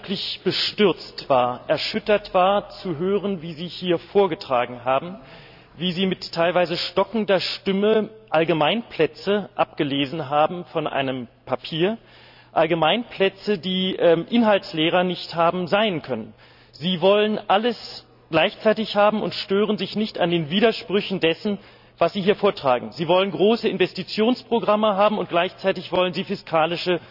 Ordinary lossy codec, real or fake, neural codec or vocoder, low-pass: none; real; none; 5.4 kHz